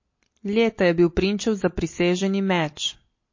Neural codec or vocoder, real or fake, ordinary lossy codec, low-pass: none; real; MP3, 32 kbps; 7.2 kHz